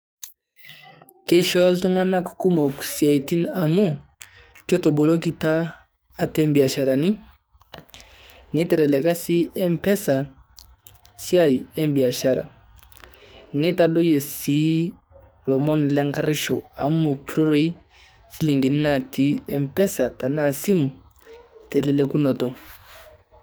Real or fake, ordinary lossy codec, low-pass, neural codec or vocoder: fake; none; none; codec, 44.1 kHz, 2.6 kbps, SNAC